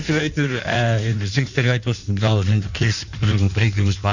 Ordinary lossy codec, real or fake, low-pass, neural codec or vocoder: none; fake; 7.2 kHz; codec, 16 kHz in and 24 kHz out, 1.1 kbps, FireRedTTS-2 codec